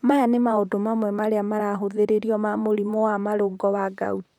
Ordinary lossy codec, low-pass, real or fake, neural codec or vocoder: none; 19.8 kHz; fake; vocoder, 44.1 kHz, 128 mel bands, Pupu-Vocoder